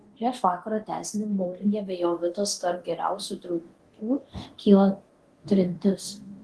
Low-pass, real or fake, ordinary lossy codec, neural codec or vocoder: 10.8 kHz; fake; Opus, 16 kbps; codec, 24 kHz, 0.9 kbps, DualCodec